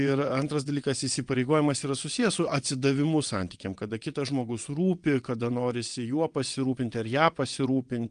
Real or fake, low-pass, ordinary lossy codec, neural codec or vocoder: fake; 9.9 kHz; Opus, 32 kbps; vocoder, 22.05 kHz, 80 mel bands, WaveNeXt